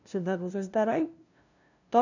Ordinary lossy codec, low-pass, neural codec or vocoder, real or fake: none; 7.2 kHz; codec, 16 kHz, 0.5 kbps, FunCodec, trained on LibriTTS, 25 frames a second; fake